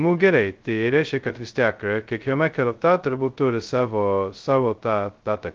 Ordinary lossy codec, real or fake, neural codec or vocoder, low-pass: Opus, 32 kbps; fake; codec, 16 kHz, 0.2 kbps, FocalCodec; 7.2 kHz